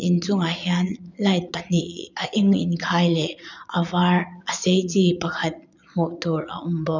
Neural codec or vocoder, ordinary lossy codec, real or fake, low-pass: vocoder, 22.05 kHz, 80 mel bands, Vocos; none; fake; 7.2 kHz